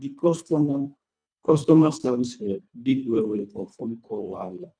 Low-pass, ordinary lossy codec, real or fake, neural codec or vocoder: 9.9 kHz; none; fake; codec, 24 kHz, 1.5 kbps, HILCodec